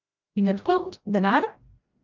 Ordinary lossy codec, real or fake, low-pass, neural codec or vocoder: Opus, 24 kbps; fake; 7.2 kHz; codec, 16 kHz, 0.5 kbps, FreqCodec, larger model